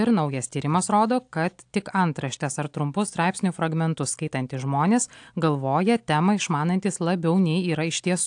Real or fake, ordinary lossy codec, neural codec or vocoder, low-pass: real; AAC, 64 kbps; none; 9.9 kHz